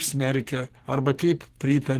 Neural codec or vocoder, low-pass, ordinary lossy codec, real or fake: codec, 44.1 kHz, 2.6 kbps, SNAC; 14.4 kHz; Opus, 16 kbps; fake